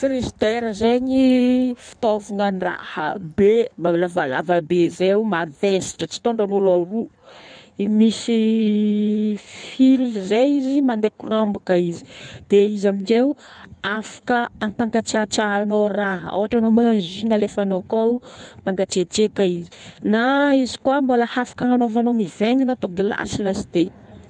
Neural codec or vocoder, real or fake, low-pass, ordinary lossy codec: codec, 16 kHz in and 24 kHz out, 1.1 kbps, FireRedTTS-2 codec; fake; 9.9 kHz; none